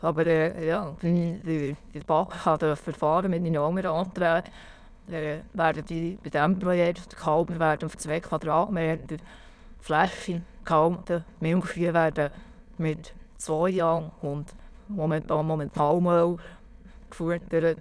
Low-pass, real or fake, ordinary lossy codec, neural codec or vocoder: none; fake; none; autoencoder, 22.05 kHz, a latent of 192 numbers a frame, VITS, trained on many speakers